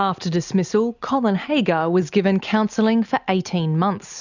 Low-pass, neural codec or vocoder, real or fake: 7.2 kHz; none; real